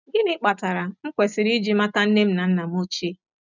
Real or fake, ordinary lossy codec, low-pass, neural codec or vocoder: real; none; 7.2 kHz; none